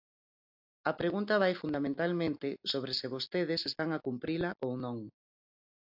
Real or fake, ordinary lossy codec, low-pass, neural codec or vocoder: real; AAC, 48 kbps; 5.4 kHz; none